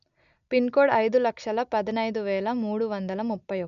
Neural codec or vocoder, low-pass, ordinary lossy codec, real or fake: none; 7.2 kHz; none; real